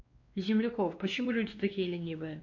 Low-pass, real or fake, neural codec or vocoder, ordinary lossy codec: 7.2 kHz; fake; codec, 16 kHz, 2 kbps, X-Codec, WavLM features, trained on Multilingual LibriSpeech; MP3, 48 kbps